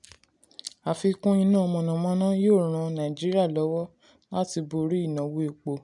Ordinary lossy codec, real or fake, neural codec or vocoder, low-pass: none; real; none; 10.8 kHz